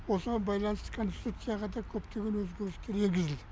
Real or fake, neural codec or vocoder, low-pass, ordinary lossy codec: real; none; none; none